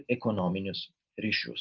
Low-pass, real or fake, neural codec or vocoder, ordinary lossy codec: 7.2 kHz; real; none; Opus, 24 kbps